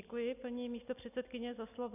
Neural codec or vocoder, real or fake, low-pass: none; real; 3.6 kHz